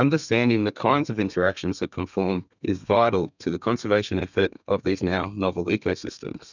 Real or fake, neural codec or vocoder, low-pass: fake; codec, 32 kHz, 1.9 kbps, SNAC; 7.2 kHz